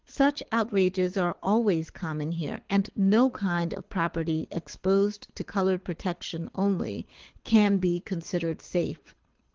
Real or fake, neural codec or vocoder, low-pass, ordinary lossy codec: fake; codec, 24 kHz, 6 kbps, HILCodec; 7.2 kHz; Opus, 16 kbps